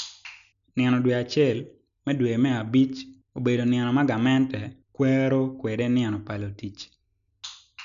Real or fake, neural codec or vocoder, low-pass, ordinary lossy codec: real; none; 7.2 kHz; none